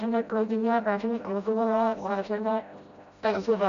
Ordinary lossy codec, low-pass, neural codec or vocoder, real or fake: AAC, 96 kbps; 7.2 kHz; codec, 16 kHz, 0.5 kbps, FreqCodec, smaller model; fake